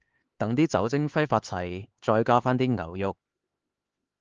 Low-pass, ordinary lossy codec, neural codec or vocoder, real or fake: 7.2 kHz; Opus, 16 kbps; codec, 16 kHz, 4 kbps, X-Codec, HuBERT features, trained on LibriSpeech; fake